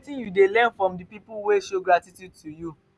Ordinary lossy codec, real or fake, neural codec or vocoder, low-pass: none; real; none; none